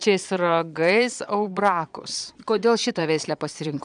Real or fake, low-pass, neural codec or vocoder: fake; 9.9 kHz; vocoder, 22.05 kHz, 80 mel bands, Vocos